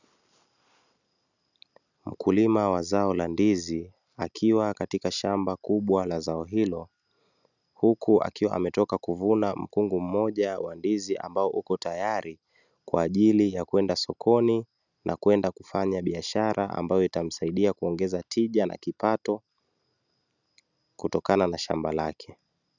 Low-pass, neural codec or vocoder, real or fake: 7.2 kHz; none; real